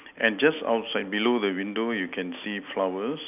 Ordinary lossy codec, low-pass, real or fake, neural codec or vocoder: none; 3.6 kHz; real; none